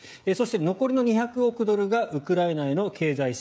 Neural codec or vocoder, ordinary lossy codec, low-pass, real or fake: codec, 16 kHz, 16 kbps, FreqCodec, smaller model; none; none; fake